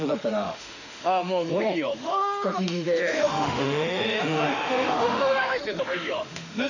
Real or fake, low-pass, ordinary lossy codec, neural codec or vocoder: fake; 7.2 kHz; none; autoencoder, 48 kHz, 32 numbers a frame, DAC-VAE, trained on Japanese speech